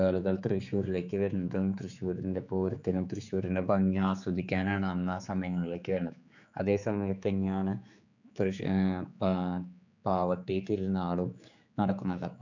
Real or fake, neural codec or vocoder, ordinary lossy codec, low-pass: fake; codec, 16 kHz, 4 kbps, X-Codec, HuBERT features, trained on general audio; none; 7.2 kHz